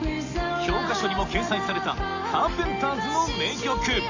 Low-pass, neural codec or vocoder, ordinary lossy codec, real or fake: 7.2 kHz; none; AAC, 48 kbps; real